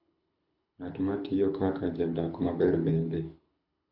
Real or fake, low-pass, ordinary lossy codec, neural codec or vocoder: fake; 5.4 kHz; none; codec, 24 kHz, 6 kbps, HILCodec